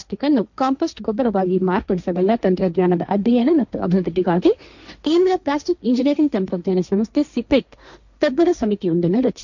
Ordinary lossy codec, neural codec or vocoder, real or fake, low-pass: none; codec, 16 kHz, 1.1 kbps, Voila-Tokenizer; fake; 7.2 kHz